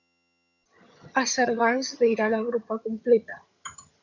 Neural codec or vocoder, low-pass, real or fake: vocoder, 22.05 kHz, 80 mel bands, HiFi-GAN; 7.2 kHz; fake